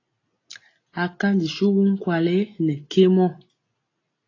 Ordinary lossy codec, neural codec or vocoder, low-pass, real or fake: AAC, 32 kbps; none; 7.2 kHz; real